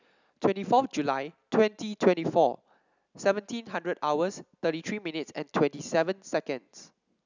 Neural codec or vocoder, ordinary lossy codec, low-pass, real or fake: none; none; 7.2 kHz; real